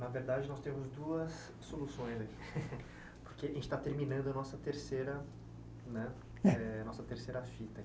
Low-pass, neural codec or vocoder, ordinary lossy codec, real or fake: none; none; none; real